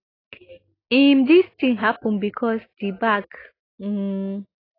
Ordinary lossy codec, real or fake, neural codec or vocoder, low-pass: AAC, 24 kbps; real; none; 5.4 kHz